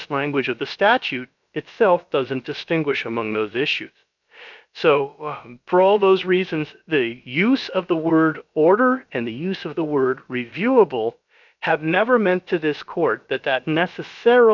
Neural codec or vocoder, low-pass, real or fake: codec, 16 kHz, about 1 kbps, DyCAST, with the encoder's durations; 7.2 kHz; fake